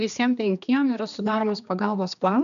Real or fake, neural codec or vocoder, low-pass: fake; codec, 16 kHz, 2 kbps, X-Codec, HuBERT features, trained on general audio; 7.2 kHz